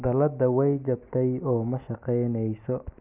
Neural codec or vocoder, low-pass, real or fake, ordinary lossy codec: none; 3.6 kHz; real; none